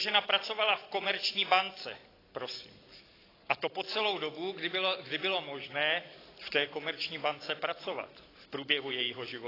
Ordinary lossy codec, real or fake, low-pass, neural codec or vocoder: AAC, 24 kbps; real; 5.4 kHz; none